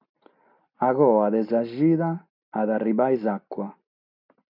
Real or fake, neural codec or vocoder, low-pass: real; none; 5.4 kHz